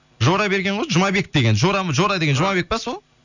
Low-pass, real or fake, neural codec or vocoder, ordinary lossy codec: 7.2 kHz; real; none; none